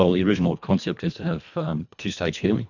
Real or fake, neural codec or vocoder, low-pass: fake; codec, 24 kHz, 1.5 kbps, HILCodec; 7.2 kHz